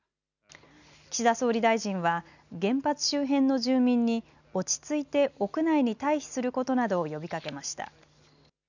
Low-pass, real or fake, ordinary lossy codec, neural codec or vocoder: 7.2 kHz; real; none; none